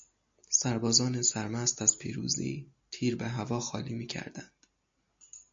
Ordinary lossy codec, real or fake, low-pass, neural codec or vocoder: MP3, 48 kbps; real; 7.2 kHz; none